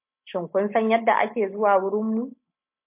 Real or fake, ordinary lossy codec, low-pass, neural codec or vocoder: real; MP3, 32 kbps; 3.6 kHz; none